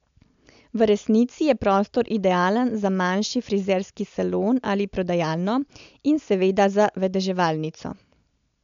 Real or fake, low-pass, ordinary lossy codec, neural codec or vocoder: real; 7.2 kHz; MP3, 64 kbps; none